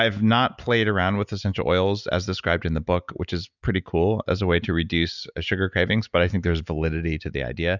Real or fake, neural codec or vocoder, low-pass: real; none; 7.2 kHz